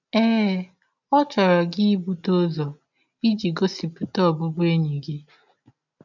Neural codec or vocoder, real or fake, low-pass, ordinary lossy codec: none; real; 7.2 kHz; none